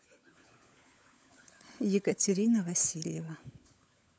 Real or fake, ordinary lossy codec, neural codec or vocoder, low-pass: fake; none; codec, 16 kHz, 16 kbps, FunCodec, trained on LibriTTS, 50 frames a second; none